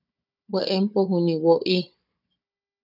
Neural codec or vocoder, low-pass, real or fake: codec, 16 kHz, 16 kbps, FunCodec, trained on Chinese and English, 50 frames a second; 5.4 kHz; fake